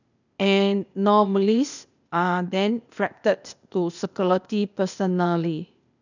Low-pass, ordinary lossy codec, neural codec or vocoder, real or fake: 7.2 kHz; none; codec, 16 kHz, 0.8 kbps, ZipCodec; fake